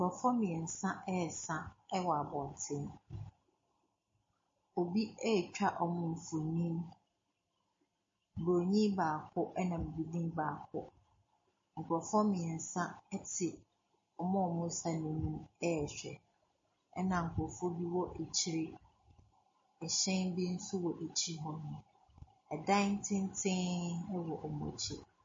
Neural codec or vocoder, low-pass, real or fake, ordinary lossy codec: none; 7.2 kHz; real; MP3, 32 kbps